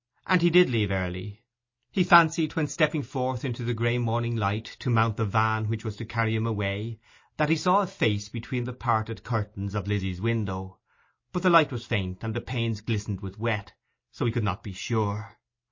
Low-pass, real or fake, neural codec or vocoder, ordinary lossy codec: 7.2 kHz; real; none; MP3, 32 kbps